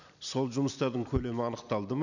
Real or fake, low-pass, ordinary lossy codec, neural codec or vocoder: real; 7.2 kHz; none; none